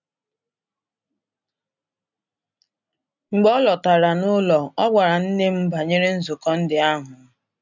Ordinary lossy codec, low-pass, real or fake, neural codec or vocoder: none; 7.2 kHz; real; none